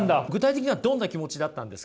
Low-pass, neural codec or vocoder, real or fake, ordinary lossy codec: none; none; real; none